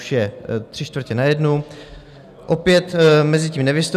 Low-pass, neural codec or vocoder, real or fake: 14.4 kHz; none; real